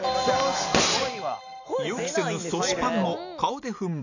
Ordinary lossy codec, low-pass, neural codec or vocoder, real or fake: none; 7.2 kHz; none; real